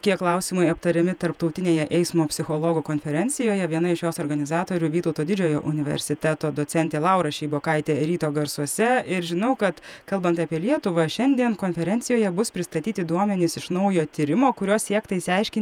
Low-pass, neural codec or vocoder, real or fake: 19.8 kHz; vocoder, 48 kHz, 128 mel bands, Vocos; fake